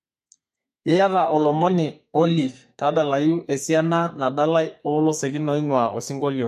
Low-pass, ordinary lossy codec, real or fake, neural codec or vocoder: 14.4 kHz; MP3, 64 kbps; fake; codec, 32 kHz, 1.9 kbps, SNAC